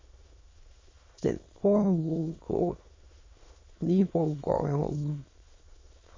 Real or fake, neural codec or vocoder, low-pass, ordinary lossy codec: fake; autoencoder, 22.05 kHz, a latent of 192 numbers a frame, VITS, trained on many speakers; 7.2 kHz; MP3, 32 kbps